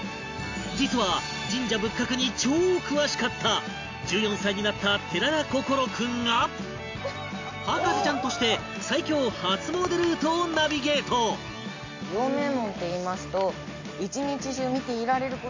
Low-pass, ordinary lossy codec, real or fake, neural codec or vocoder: 7.2 kHz; AAC, 48 kbps; real; none